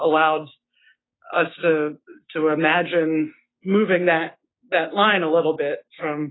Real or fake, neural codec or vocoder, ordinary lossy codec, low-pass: fake; codec, 16 kHz, 6 kbps, DAC; AAC, 16 kbps; 7.2 kHz